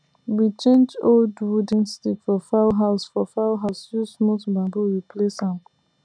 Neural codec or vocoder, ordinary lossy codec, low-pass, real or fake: none; none; 9.9 kHz; real